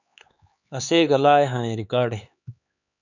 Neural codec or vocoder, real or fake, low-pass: codec, 16 kHz, 4 kbps, X-Codec, HuBERT features, trained on LibriSpeech; fake; 7.2 kHz